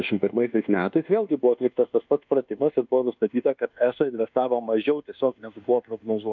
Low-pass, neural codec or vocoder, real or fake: 7.2 kHz; codec, 24 kHz, 1.2 kbps, DualCodec; fake